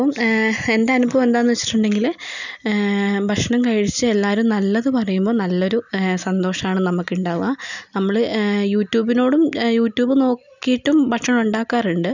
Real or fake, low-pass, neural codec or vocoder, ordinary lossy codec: real; 7.2 kHz; none; none